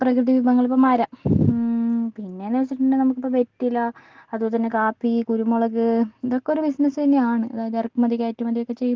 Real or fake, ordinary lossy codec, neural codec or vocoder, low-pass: real; Opus, 16 kbps; none; 7.2 kHz